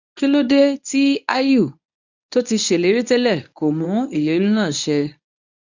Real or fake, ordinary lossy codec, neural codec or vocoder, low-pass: fake; none; codec, 24 kHz, 0.9 kbps, WavTokenizer, medium speech release version 1; 7.2 kHz